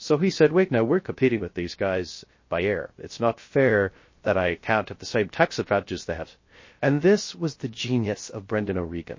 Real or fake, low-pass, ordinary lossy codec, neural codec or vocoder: fake; 7.2 kHz; MP3, 32 kbps; codec, 16 kHz, 0.3 kbps, FocalCodec